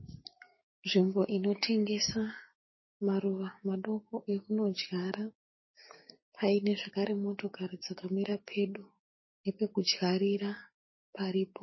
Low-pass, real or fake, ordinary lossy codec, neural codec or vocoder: 7.2 kHz; fake; MP3, 24 kbps; codec, 16 kHz, 6 kbps, DAC